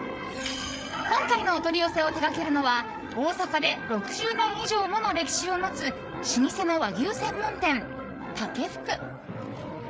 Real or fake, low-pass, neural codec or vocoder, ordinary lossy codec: fake; none; codec, 16 kHz, 8 kbps, FreqCodec, larger model; none